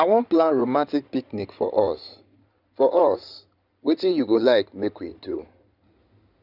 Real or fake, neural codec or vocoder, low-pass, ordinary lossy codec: fake; codec, 16 kHz in and 24 kHz out, 2.2 kbps, FireRedTTS-2 codec; 5.4 kHz; none